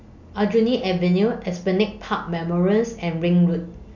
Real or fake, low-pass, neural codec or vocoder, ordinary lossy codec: real; 7.2 kHz; none; none